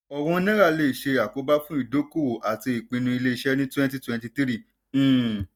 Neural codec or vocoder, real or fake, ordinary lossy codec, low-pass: none; real; none; 19.8 kHz